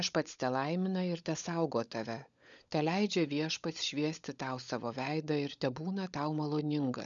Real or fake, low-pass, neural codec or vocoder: fake; 7.2 kHz; codec, 16 kHz, 16 kbps, FunCodec, trained on LibriTTS, 50 frames a second